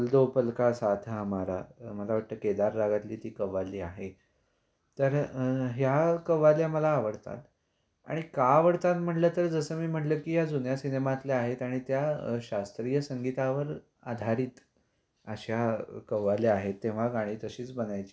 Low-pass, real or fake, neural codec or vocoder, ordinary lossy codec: none; real; none; none